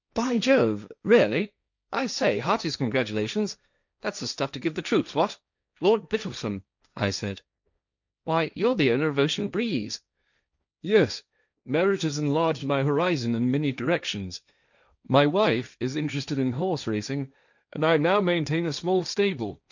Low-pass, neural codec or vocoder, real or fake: 7.2 kHz; codec, 16 kHz, 1.1 kbps, Voila-Tokenizer; fake